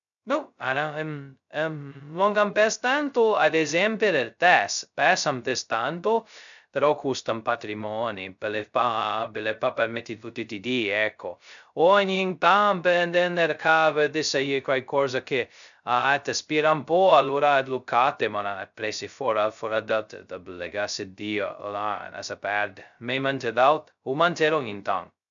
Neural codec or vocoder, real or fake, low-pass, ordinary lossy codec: codec, 16 kHz, 0.2 kbps, FocalCodec; fake; 7.2 kHz; MP3, 96 kbps